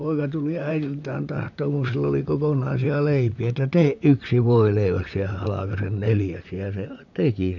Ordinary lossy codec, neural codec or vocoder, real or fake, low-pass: none; vocoder, 44.1 kHz, 128 mel bands, Pupu-Vocoder; fake; 7.2 kHz